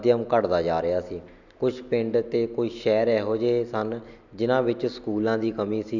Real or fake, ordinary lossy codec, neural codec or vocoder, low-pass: real; none; none; 7.2 kHz